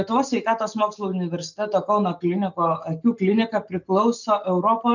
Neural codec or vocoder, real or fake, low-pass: none; real; 7.2 kHz